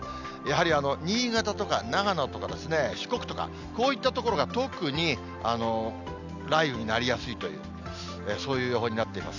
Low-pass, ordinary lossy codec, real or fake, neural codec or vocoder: 7.2 kHz; none; real; none